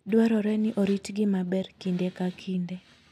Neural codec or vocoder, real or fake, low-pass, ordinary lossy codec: none; real; 14.4 kHz; none